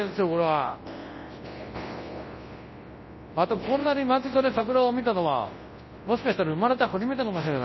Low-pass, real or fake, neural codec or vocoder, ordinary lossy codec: 7.2 kHz; fake; codec, 24 kHz, 0.9 kbps, WavTokenizer, large speech release; MP3, 24 kbps